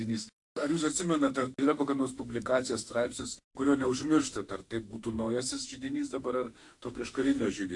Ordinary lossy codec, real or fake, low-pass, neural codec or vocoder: AAC, 64 kbps; fake; 10.8 kHz; autoencoder, 48 kHz, 32 numbers a frame, DAC-VAE, trained on Japanese speech